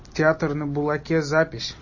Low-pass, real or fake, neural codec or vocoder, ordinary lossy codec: 7.2 kHz; real; none; MP3, 32 kbps